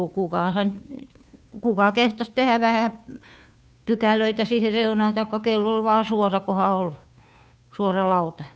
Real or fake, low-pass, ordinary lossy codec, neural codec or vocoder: fake; none; none; codec, 16 kHz, 2 kbps, FunCodec, trained on Chinese and English, 25 frames a second